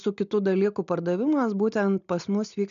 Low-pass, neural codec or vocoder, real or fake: 7.2 kHz; none; real